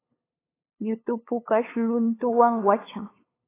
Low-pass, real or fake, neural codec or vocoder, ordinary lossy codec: 3.6 kHz; fake; codec, 16 kHz, 8 kbps, FunCodec, trained on LibriTTS, 25 frames a second; AAC, 16 kbps